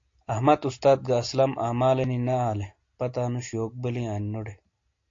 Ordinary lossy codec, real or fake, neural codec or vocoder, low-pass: AAC, 48 kbps; real; none; 7.2 kHz